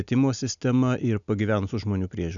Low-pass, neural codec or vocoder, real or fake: 7.2 kHz; none; real